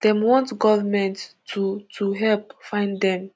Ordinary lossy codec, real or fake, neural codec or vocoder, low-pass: none; real; none; none